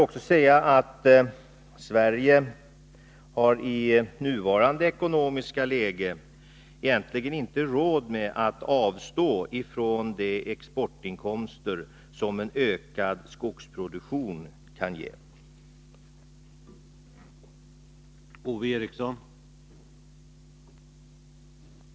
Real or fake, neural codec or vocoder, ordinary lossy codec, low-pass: real; none; none; none